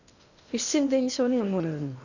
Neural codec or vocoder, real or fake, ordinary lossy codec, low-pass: codec, 16 kHz in and 24 kHz out, 0.8 kbps, FocalCodec, streaming, 65536 codes; fake; none; 7.2 kHz